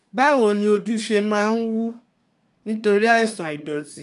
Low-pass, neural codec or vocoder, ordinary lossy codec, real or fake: 10.8 kHz; codec, 24 kHz, 1 kbps, SNAC; none; fake